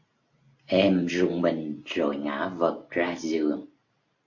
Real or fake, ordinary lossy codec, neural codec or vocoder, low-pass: real; AAC, 48 kbps; none; 7.2 kHz